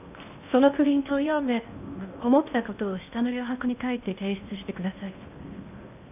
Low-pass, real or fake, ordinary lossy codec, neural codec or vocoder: 3.6 kHz; fake; none; codec, 16 kHz in and 24 kHz out, 0.8 kbps, FocalCodec, streaming, 65536 codes